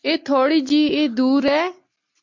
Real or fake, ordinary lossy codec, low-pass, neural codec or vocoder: real; MP3, 48 kbps; 7.2 kHz; none